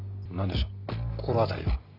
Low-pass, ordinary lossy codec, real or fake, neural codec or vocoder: 5.4 kHz; none; real; none